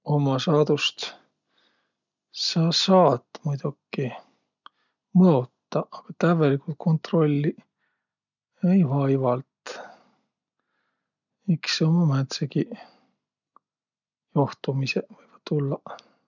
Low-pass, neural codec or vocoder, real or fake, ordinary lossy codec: 7.2 kHz; none; real; none